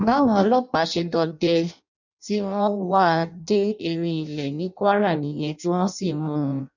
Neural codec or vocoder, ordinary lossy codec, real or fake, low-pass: codec, 16 kHz in and 24 kHz out, 0.6 kbps, FireRedTTS-2 codec; none; fake; 7.2 kHz